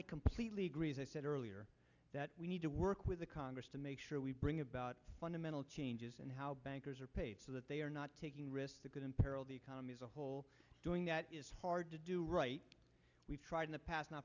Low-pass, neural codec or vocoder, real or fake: 7.2 kHz; none; real